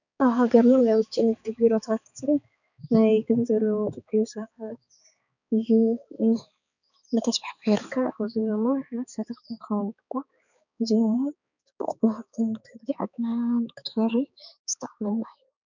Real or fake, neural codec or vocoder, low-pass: fake; codec, 16 kHz, 4 kbps, X-Codec, HuBERT features, trained on balanced general audio; 7.2 kHz